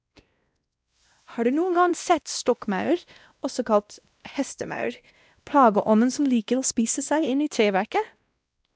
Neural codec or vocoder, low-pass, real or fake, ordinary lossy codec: codec, 16 kHz, 1 kbps, X-Codec, WavLM features, trained on Multilingual LibriSpeech; none; fake; none